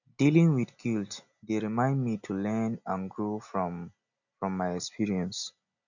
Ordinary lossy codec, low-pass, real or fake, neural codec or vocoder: none; 7.2 kHz; real; none